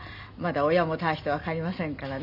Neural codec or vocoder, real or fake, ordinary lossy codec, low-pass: none; real; none; 5.4 kHz